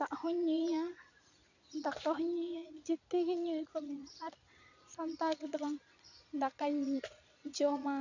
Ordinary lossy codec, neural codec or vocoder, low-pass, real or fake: none; vocoder, 22.05 kHz, 80 mel bands, Vocos; 7.2 kHz; fake